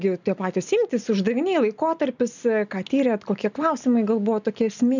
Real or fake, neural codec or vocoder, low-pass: real; none; 7.2 kHz